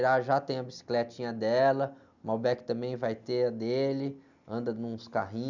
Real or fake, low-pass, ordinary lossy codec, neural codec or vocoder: real; 7.2 kHz; none; none